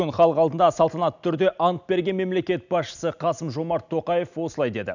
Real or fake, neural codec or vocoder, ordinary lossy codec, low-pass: real; none; none; 7.2 kHz